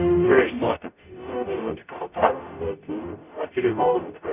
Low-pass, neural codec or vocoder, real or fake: 3.6 kHz; codec, 44.1 kHz, 0.9 kbps, DAC; fake